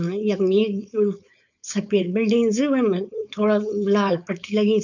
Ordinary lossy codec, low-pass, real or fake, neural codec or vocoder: none; 7.2 kHz; fake; codec, 16 kHz, 4.8 kbps, FACodec